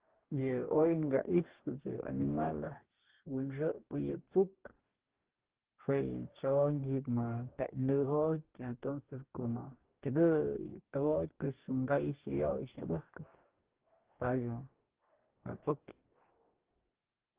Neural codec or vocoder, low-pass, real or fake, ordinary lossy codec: codec, 44.1 kHz, 2.6 kbps, DAC; 3.6 kHz; fake; Opus, 16 kbps